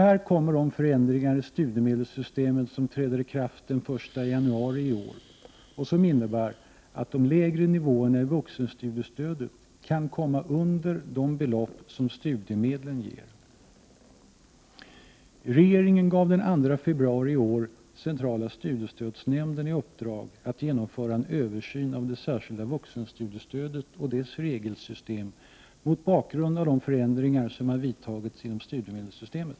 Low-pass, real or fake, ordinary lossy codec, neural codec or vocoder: none; real; none; none